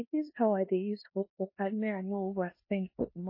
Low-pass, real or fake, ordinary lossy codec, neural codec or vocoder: 5.4 kHz; fake; MP3, 24 kbps; codec, 16 kHz in and 24 kHz out, 0.9 kbps, LongCat-Audio-Codec, four codebook decoder